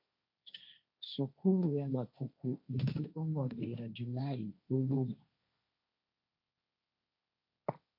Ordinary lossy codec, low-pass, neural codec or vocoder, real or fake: MP3, 32 kbps; 5.4 kHz; codec, 16 kHz, 1.1 kbps, Voila-Tokenizer; fake